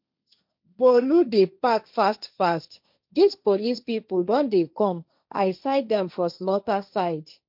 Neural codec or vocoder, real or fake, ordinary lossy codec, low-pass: codec, 16 kHz, 1.1 kbps, Voila-Tokenizer; fake; MP3, 48 kbps; 5.4 kHz